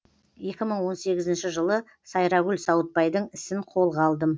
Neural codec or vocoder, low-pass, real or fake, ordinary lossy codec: none; none; real; none